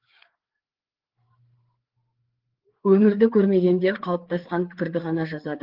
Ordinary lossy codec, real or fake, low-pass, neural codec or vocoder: Opus, 16 kbps; fake; 5.4 kHz; codec, 16 kHz, 4 kbps, FreqCodec, larger model